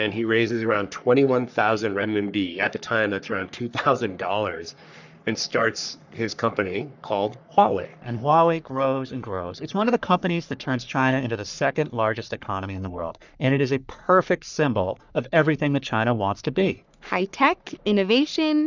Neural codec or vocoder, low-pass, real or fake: codec, 44.1 kHz, 3.4 kbps, Pupu-Codec; 7.2 kHz; fake